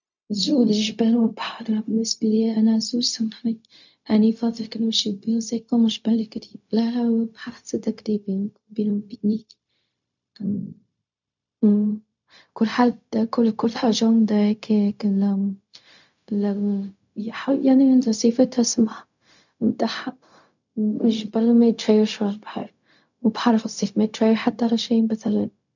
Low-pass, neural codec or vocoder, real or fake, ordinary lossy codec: 7.2 kHz; codec, 16 kHz, 0.4 kbps, LongCat-Audio-Codec; fake; none